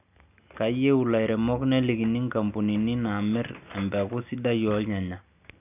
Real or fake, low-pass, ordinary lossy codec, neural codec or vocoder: real; 3.6 kHz; none; none